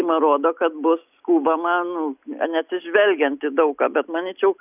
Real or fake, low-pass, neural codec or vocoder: real; 3.6 kHz; none